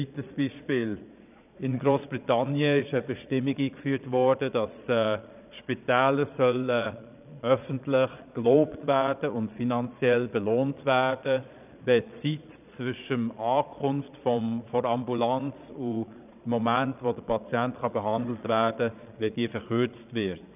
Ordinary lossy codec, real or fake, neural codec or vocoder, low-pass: none; fake; vocoder, 22.05 kHz, 80 mel bands, Vocos; 3.6 kHz